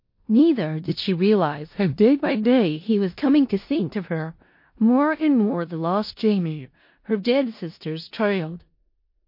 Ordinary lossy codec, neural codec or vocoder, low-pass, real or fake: MP3, 32 kbps; codec, 16 kHz in and 24 kHz out, 0.4 kbps, LongCat-Audio-Codec, four codebook decoder; 5.4 kHz; fake